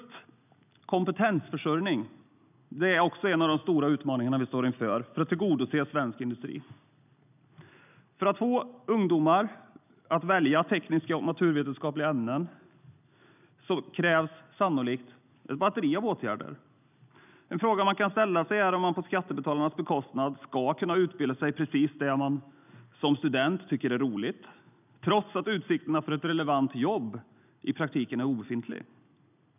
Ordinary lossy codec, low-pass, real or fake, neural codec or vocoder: none; 3.6 kHz; real; none